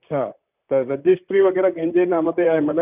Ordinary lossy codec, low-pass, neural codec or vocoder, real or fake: none; 3.6 kHz; vocoder, 44.1 kHz, 128 mel bands, Pupu-Vocoder; fake